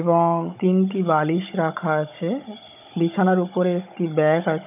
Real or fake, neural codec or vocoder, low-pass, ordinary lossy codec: fake; codec, 16 kHz, 16 kbps, FunCodec, trained on Chinese and English, 50 frames a second; 3.6 kHz; none